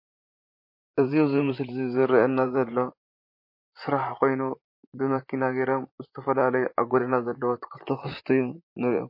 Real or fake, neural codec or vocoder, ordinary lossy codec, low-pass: fake; vocoder, 44.1 kHz, 128 mel bands, Pupu-Vocoder; MP3, 32 kbps; 5.4 kHz